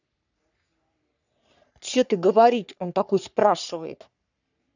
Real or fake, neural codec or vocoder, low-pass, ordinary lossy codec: fake; codec, 44.1 kHz, 3.4 kbps, Pupu-Codec; 7.2 kHz; none